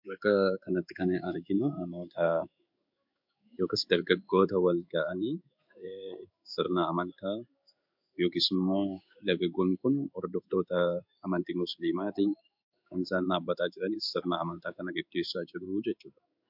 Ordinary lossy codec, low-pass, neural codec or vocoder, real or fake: MP3, 48 kbps; 5.4 kHz; codec, 16 kHz in and 24 kHz out, 1 kbps, XY-Tokenizer; fake